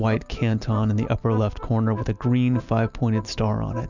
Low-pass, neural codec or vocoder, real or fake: 7.2 kHz; none; real